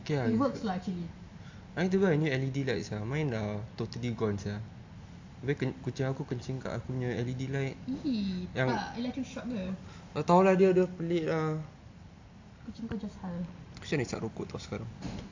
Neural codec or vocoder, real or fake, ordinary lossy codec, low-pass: none; real; AAC, 48 kbps; 7.2 kHz